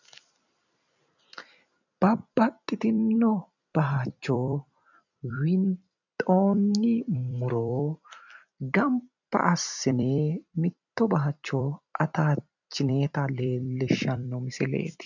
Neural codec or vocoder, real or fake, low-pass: vocoder, 44.1 kHz, 128 mel bands every 256 samples, BigVGAN v2; fake; 7.2 kHz